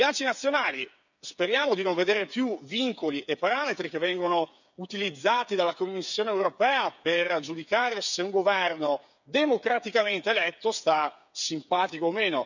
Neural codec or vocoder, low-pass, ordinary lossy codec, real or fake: codec, 16 kHz, 8 kbps, FreqCodec, smaller model; 7.2 kHz; none; fake